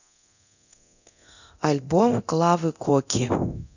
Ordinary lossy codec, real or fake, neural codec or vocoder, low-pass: none; fake; codec, 24 kHz, 0.9 kbps, DualCodec; 7.2 kHz